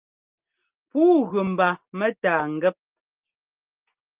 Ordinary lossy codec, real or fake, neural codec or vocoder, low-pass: Opus, 32 kbps; real; none; 3.6 kHz